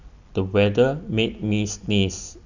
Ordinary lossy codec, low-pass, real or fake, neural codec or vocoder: none; 7.2 kHz; real; none